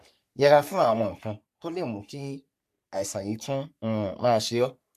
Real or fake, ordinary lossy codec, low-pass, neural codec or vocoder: fake; AAC, 96 kbps; 14.4 kHz; codec, 44.1 kHz, 3.4 kbps, Pupu-Codec